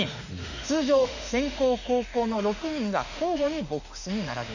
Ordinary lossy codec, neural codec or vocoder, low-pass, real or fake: none; autoencoder, 48 kHz, 32 numbers a frame, DAC-VAE, trained on Japanese speech; 7.2 kHz; fake